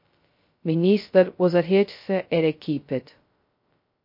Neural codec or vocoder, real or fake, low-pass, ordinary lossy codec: codec, 16 kHz, 0.2 kbps, FocalCodec; fake; 5.4 kHz; MP3, 32 kbps